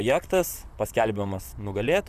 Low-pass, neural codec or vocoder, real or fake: 14.4 kHz; vocoder, 44.1 kHz, 128 mel bands, Pupu-Vocoder; fake